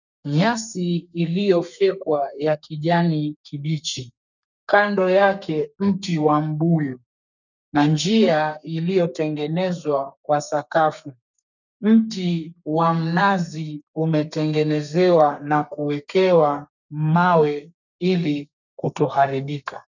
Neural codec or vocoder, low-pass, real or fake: codec, 32 kHz, 1.9 kbps, SNAC; 7.2 kHz; fake